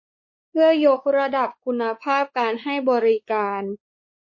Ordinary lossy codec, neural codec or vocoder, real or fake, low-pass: MP3, 32 kbps; codec, 16 kHz, 4 kbps, X-Codec, WavLM features, trained on Multilingual LibriSpeech; fake; 7.2 kHz